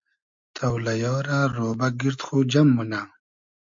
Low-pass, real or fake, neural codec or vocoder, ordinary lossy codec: 7.2 kHz; real; none; MP3, 64 kbps